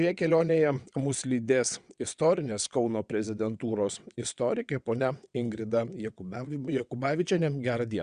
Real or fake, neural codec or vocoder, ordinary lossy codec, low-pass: fake; vocoder, 22.05 kHz, 80 mel bands, WaveNeXt; Opus, 64 kbps; 9.9 kHz